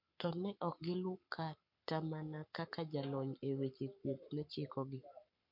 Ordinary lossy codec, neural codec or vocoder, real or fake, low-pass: MP3, 48 kbps; codec, 44.1 kHz, 7.8 kbps, Pupu-Codec; fake; 5.4 kHz